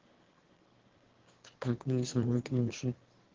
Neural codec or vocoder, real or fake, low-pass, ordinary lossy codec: autoencoder, 22.05 kHz, a latent of 192 numbers a frame, VITS, trained on one speaker; fake; 7.2 kHz; Opus, 16 kbps